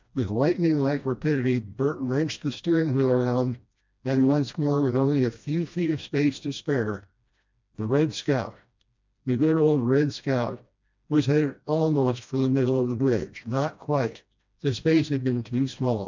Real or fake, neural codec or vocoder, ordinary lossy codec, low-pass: fake; codec, 16 kHz, 1 kbps, FreqCodec, smaller model; MP3, 64 kbps; 7.2 kHz